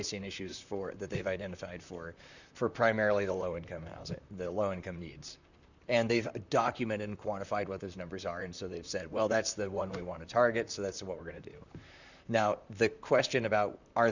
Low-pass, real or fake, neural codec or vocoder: 7.2 kHz; fake; vocoder, 44.1 kHz, 128 mel bands, Pupu-Vocoder